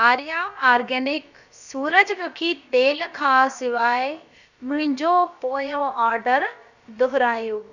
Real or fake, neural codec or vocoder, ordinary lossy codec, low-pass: fake; codec, 16 kHz, about 1 kbps, DyCAST, with the encoder's durations; none; 7.2 kHz